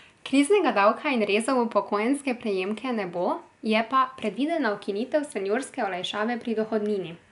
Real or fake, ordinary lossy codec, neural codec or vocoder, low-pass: real; none; none; 10.8 kHz